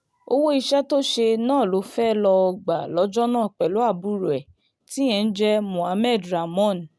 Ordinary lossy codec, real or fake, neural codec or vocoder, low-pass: none; real; none; none